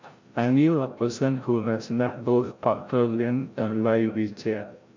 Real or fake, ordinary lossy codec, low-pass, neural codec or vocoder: fake; MP3, 48 kbps; 7.2 kHz; codec, 16 kHz, 0.5 kbps, FreqCodec, larger model